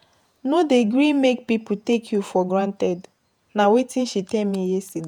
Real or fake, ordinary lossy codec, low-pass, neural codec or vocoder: fake; none; 19.8 kHz; vocoder, 48 kHz, 128 mel bands, Vocos